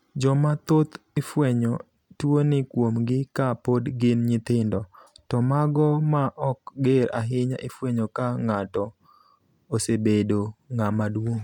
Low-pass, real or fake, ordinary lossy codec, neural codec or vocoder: 19.8 kHz; real; none; none